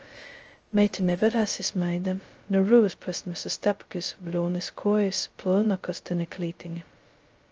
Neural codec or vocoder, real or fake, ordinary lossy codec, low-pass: codec, 16 kHz, 0.2 kbps, FocalCodec; fake; Opus, 24 kbps; 7.2 kHz